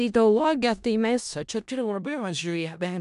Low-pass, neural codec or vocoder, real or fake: 10.8 kHz; codec, 16 kHz in and 24 kHz out, 0.4 kbps, LongCat-Audio-Codec, four codebook decoder; fake